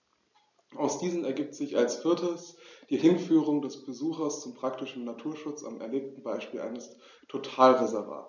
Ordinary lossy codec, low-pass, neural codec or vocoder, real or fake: none; 7.2 kHz; none; real